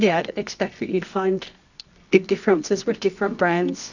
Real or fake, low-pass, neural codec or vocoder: fake; 7.2 kHz; codec, 24 kHz, 0.9 kbps, WavTokenizer, medium music audio release